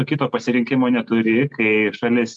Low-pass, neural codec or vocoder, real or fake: 10.8 kHz; none; real